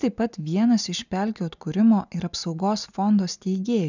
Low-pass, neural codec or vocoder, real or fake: 7.2 kHz; none; real